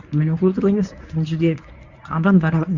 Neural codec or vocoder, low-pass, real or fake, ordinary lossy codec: codec, 24 kHz, 0.9 kbps, WavTokenizer, medium speech release version 1; 7.2 kHz; fake; none